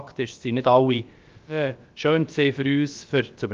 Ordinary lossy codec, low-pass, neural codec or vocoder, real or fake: Opus, 32 kbps; 7.2 kHz; codec, 16 kHz, about 1 kbps, DyCAST, with the encoder's durations; fake